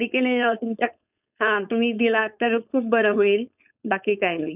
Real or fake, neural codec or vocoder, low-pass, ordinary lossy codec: fake; codec, 16 kHz, 4.8 kbps, FACodec; 3.6 kHz; none